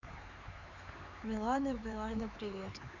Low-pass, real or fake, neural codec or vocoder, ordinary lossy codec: 7.2 kHz; fake; codec, 16 kHz, 4 kbps, X-Codec, HuBERT features, trained on LibriSpeech; none